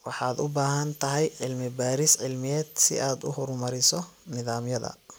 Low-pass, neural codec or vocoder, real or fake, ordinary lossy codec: none; none; real; none